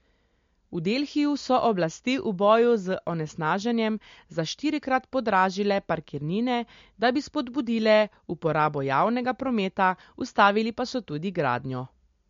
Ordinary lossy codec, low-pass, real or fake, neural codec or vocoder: MP3, 48 kbps; 7.2 kHz; real; none